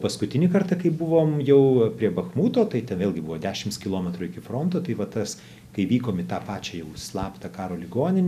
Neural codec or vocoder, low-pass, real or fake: none; 14.4 kHz; real